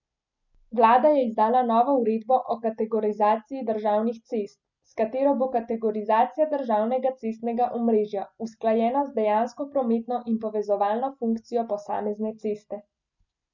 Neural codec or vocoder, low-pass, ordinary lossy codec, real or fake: none; 7.2 kHz; none; real